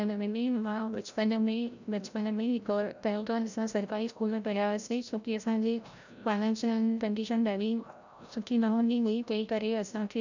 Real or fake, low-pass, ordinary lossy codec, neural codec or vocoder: fake; 7.2 kHz; none; codec, 16 kHz, 0.5 kbps, FreqCodec, larger model